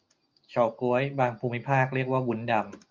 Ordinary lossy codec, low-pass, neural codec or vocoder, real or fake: Opus, 32 kbps; 7.2 kHz; none; real